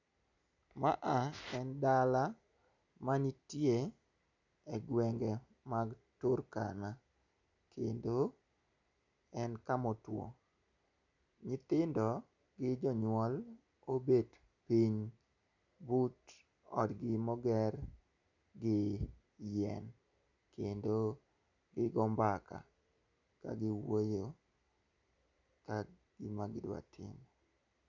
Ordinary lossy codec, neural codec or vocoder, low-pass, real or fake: none; none; 7.2 kHz; real